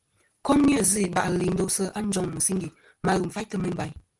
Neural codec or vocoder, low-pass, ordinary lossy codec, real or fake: none; 10.8 kHz; Opus, 24 kbps; real